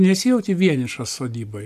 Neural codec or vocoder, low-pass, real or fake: none; 14.4 kHz; real